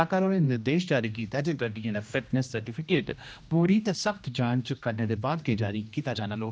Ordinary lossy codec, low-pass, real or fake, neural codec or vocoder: none; none; fake; codec, 16 kHz, 1 kbps, X-Codec, HuBERT features, trained on general audio